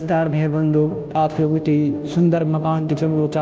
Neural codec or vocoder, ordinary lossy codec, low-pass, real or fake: codec, 16 kHz, 0.5 kbps, FunCodec, trained on Chinese and English, 25 frames a second; none; none; fake